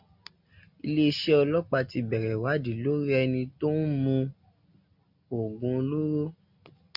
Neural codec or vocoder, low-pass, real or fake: none; 5.4 kHz; real